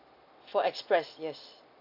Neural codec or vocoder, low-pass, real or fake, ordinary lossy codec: none; 5.4 kHz; real; MP3, 48 kbps